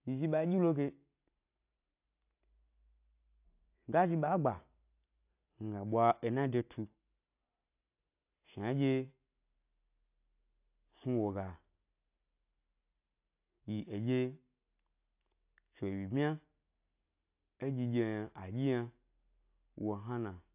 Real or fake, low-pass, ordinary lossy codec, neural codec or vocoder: real; 3.6 kHz; AAC, 32 kbps; none